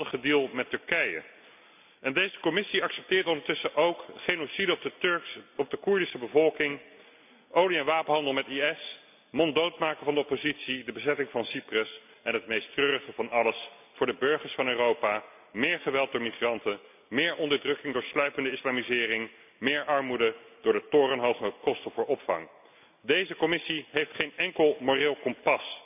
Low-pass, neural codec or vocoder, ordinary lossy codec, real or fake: 3.6 kHz; none; none; real